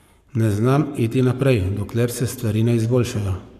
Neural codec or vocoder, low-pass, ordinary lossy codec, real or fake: codec, 44.1 kHz, 7.8 kbps, Pupu-Codec; 14.4 kHz; none; fake